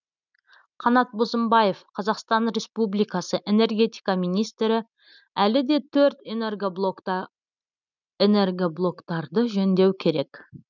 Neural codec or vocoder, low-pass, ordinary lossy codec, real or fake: none; 7.2 kHz; none; real